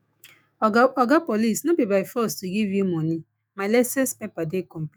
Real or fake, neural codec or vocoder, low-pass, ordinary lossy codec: fake; autoencoder, 48 kHz, 128 numbers a frame, DAC-VAE, trained on Japanese speech; none; none